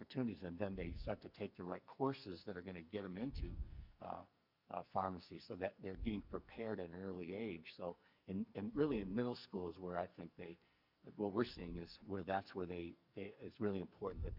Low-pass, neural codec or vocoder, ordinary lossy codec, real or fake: 5.4 kHz; codec, 44.1 kHz, 2.6 kbps, SNAC; Opus, 64 kbps; fake